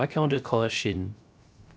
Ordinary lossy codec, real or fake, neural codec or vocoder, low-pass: none; fake; codec, 16 kHz, 0.3 kbps, FocalCodec; none